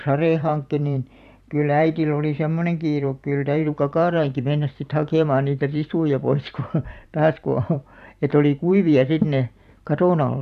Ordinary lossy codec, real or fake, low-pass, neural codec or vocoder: none; fake; 14.4 kHz; vocoder, 44.1 kHz, 128 mel bands every 512 samples, BigVGAN v2